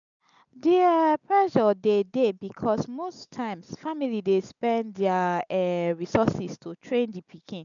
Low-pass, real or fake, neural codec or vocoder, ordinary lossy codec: 7.2 kHz; real; none; none